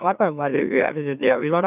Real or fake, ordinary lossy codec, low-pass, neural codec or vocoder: fake; none; 3.6 kHz; autoencoder, 44.1 kHz, a latent of 192 numbers a frame, MeloTTS